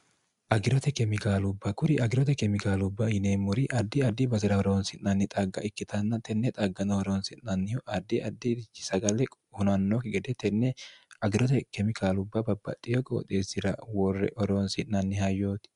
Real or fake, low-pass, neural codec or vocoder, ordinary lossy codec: real; 10.8 kHz; none; MP3, 96 kbps